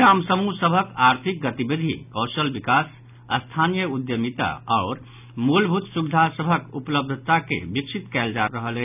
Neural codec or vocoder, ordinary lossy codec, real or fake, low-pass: none; none; real; 3.6 kHz